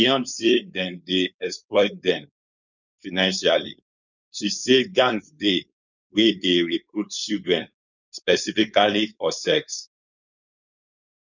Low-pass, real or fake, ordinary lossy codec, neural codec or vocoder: 7.2 kHz; fake; none; codec, 16 kHz, 4.8 kbps, FACodec